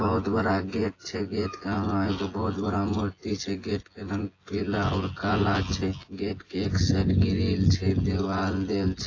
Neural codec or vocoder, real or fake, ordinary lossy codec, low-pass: vocoder, 24 kHz, 100 mel bands, Vocos; fake; AAC, 32 kbps; 7.2 kHz